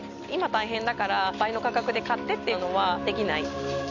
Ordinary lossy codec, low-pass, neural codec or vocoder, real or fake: none; 7.2 kHz; none; real